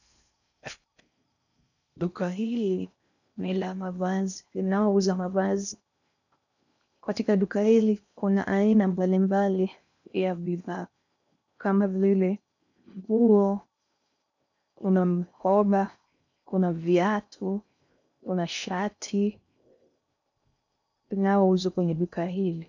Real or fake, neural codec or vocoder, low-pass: fake; codec, 16 kHz in and 24 kHz out, 0.6 kbps, FocalCodec, streaming, 4096 codes; 7.2 kHz